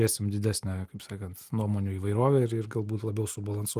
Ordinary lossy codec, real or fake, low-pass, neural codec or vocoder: Opus, 24 kbps; real; 14.4 kHz; none